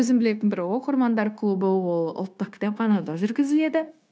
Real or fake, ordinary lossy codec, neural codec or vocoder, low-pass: fake; none; codec, 16 kHz, 0.9 kbps, LongCat-Audio-Codec; none